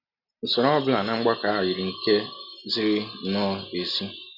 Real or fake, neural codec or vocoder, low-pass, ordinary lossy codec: real; none; 5.4 kHz; none